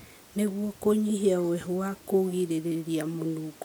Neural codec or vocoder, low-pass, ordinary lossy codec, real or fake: none; none; none; real